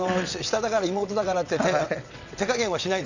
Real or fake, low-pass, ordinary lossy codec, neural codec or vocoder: fake; 7.2 kHz; none; vocoder, 22.05 kHz, 80 mel bands, WaveNeXt